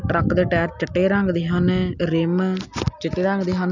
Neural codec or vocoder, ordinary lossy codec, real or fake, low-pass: none; none; real; 7.2 kHz